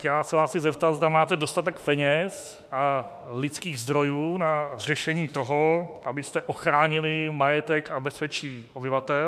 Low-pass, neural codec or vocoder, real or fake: 14.4 kHz; autoencoder, 48 kHz, 32 numbers a frame, DAC-VAE, trained on Japanese speech; fake